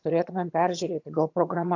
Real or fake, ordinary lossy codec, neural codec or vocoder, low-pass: fake; AAC, 48 kbps; vocoder, 22.05 kHz, 80 mel bands, HiFi-GAN; 7.2 kHz